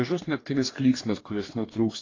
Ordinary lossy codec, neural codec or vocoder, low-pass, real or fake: AAC, 32 kbps; codec, 44.1 kHz, 2.6 kbps, DAC; 7.2 kHz; fake